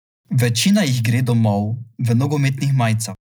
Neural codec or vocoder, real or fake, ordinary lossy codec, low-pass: none; real; none; none